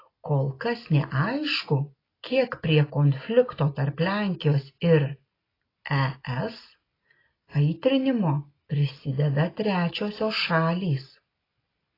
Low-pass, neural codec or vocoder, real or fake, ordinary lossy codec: 5.4 kHz; none; real; AAC, 24 kbps